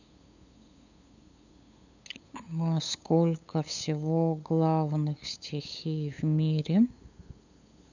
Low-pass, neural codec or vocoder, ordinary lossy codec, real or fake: 7.2 kHz; codec, 16 kHz, 8 kbps, FunCodec, trained on LibriTTS, 25 frames a second; none; fake